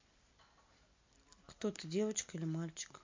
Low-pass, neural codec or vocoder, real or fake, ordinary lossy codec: 7.2 kHz; none; real; MP3, 48 kbps